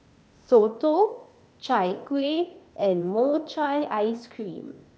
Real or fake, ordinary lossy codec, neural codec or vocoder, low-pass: fake; none; codec, 16 kHz, 0.8 kbps, ZipCodec; none